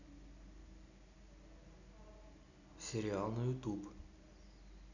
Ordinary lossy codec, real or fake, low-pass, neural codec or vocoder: none; real; 7.2 kHz; none